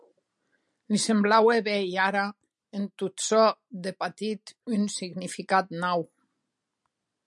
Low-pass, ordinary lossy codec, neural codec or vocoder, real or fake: 10.8 kHz; MP3, 96 kbps; none; real